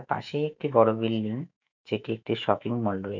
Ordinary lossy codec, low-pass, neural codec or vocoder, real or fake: none; 7.2 kHz; autoencoder, 48 kHz, 128 numbers a frame, DAC-VAE, trained on Japanese speech; fake